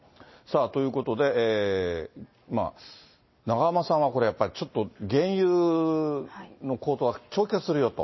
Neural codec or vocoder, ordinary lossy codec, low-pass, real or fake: none; MP3, 24 kbps; 7.2 kHz; real